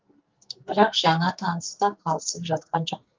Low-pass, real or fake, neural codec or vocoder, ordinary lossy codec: 7.2 kHz; fake; codec, 44.1 kHz, 2.6 kbps, SNAC; Opus, 24 kbps